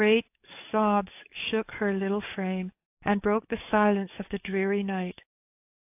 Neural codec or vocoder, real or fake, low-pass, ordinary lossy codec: codec, 44.1 kHz, 7.8 kbps, DAC; fake; 3.6 kHz; AAC, 32 kbps